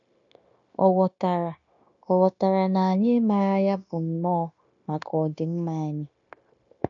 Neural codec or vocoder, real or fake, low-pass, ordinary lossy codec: codec, 16 kHz, 0.9 kbps, LongCat-Audio-Codec; fake; 7.2 kHz; AAC, 64 kbps